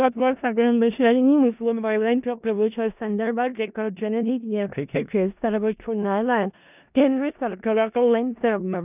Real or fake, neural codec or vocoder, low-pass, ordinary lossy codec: fake; codec, 16 kHz in and 24 kHz out, 0.4 kbps, LongCat-Audio-Codec, four codebook decoder; 3.6 kHz; none